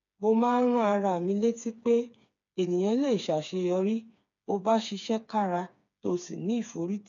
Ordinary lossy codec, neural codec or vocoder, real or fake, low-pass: none; codec, 16 kHz, 4 kbps, FreqCodec, smaller model; fake; 7.2 kHz